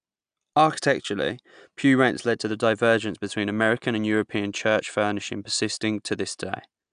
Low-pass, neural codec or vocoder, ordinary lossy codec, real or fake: 9.9 kHz; none; AAC, 96 kbps; real